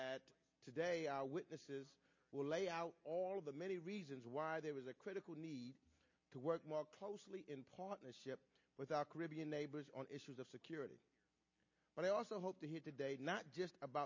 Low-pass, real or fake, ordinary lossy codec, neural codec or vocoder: 7.2 kHz; real; MP3, 32 kbps; none